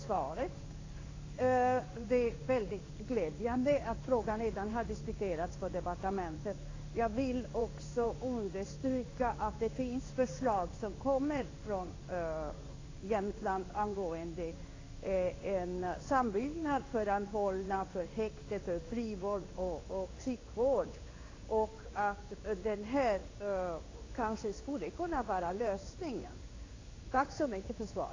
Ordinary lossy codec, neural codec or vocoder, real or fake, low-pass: AAC, 32 kbps; codec, 16 kHz in and 24 kHz out, 1 kbps, XY-Tokenizer; fake; 7.2 kHz